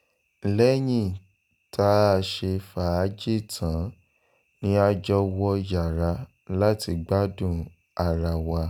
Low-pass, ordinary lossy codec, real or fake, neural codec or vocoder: none; none; real; none